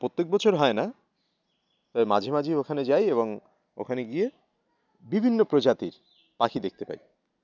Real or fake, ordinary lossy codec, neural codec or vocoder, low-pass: real; none; none; 7.2 kHz